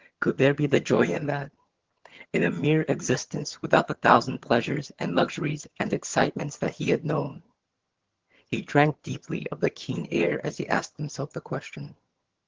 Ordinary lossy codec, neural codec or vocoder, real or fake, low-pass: Opus, 16 kbps; vocoder, 22.05 kHz, 80 mel bands, HiFi-GAN; fake; 7.2 kHz